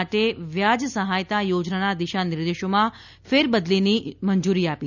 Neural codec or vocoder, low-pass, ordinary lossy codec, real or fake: none; 7.2 kHz; none; real